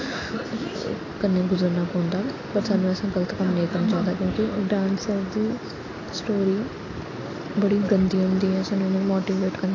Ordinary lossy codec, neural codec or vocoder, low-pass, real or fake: AAC, 32 kbps; none; 7.2 kHz; real